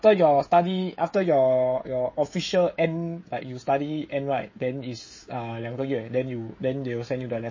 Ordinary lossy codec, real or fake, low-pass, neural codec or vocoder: MP3, 32 kbps; fake; 7.2 kHz; codec, 16 kHz, 16 kbps, FreqCodec, smaller model